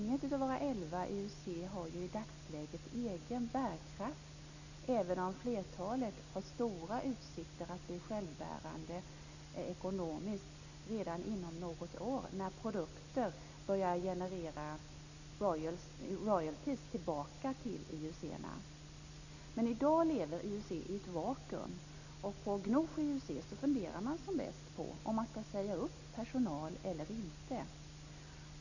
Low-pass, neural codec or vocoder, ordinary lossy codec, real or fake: 7.2 kHz; none; none; real